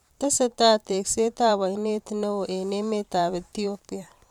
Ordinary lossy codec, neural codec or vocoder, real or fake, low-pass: none; vocoder, 44.1 kHz, 128 mel bands every 512 samples, BigVGAN v2; fake; 19.8 kHz